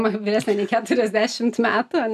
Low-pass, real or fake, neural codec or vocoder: 14.4 kHz; real; none